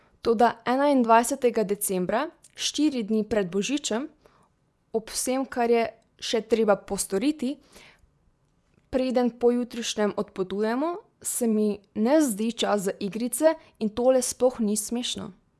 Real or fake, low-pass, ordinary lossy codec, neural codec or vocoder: fake; none; none; vocoder, 24 kHz, 100 mel bands, Vocos